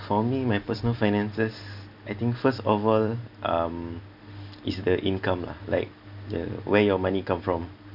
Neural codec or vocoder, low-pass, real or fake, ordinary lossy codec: none; 5.4 kHz; real; none